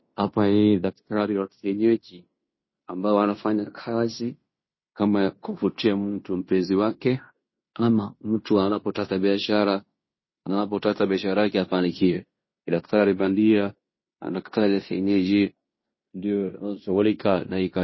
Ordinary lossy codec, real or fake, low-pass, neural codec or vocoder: MP3, 24 kbps; fake; 7.2 kHz; codec, 16 kHz in and 24 kHz out, 0.9 kbps, LongCat-Audio-Codec, fine tuned four codebook decoder